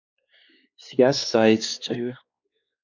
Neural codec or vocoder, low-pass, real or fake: codec, 16 kHz, 2 kbps, X-Codec, WavLM features, trained on Multilingual LibriSpeech; 7.2 kHz; fake